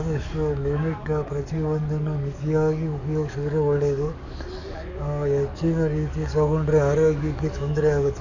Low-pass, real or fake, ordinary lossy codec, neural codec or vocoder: 7.2 kHz; fake; none; codec, 44.1 kHz, 7.8 kbps, DAC